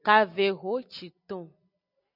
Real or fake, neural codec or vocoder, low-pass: real; none; 5.4 kHz